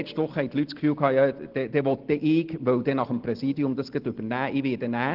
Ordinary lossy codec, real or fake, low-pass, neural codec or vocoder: Opus, 16 kbps; real; 5.4 kHz; none